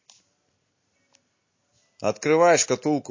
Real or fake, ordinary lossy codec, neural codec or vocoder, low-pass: real; MP3, 32 kbps; none; 7.2 kHz